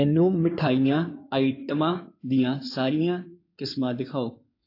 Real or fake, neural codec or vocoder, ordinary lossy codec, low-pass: fake; codec, 16 kHz in and 24 kHz out, 2.2 kbps, FireRedTTS-2 codec; AAC, 32 kbps; 5.4 kHz